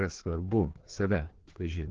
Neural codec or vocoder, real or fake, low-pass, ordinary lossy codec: codec, 16 kHz, 2 kbps, FreqCodec, larger model; fake; 7.2 kHz; Opus, 16 kbps